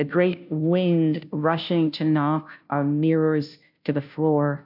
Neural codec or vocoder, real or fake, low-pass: codec, 16 kHz, 0.5 kbps, FunCodec, trained on Chinese and English, 25 frames a second; fake; 5.4 kHz